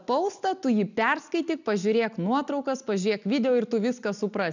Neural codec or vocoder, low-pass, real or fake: none; 7.2 kHz; real